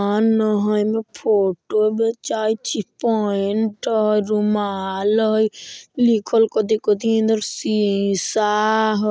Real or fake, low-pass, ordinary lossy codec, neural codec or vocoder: real; none; none; none